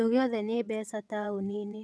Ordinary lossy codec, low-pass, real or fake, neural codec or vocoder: none; none; fake; vocoder, 22.05 kHz, 80 mel bands, WaveNeXt